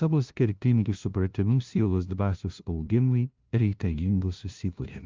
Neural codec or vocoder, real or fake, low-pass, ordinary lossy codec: codec, 16 kHz, 0.5 kbps, FunCodec, trained on LibriTTS, 25 frames a second; fake; 7.2 kHz; Opus, 24 kbps